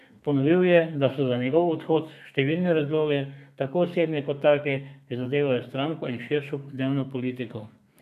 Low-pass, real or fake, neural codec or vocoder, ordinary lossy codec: 14.4 kHz; fake; codec, 32 kHz, 1.9 kbps, SNAC; none